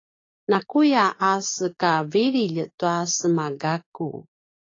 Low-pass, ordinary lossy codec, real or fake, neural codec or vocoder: 7.2 kHz; AAC, 32 kbps; fake; codec, 16 kHz, 6 kbps, DAC